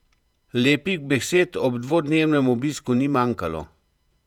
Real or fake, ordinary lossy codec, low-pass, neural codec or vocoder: fake; none; 19.8 kHz; vocoder, 48 kHz, 128 mel bands, Vocos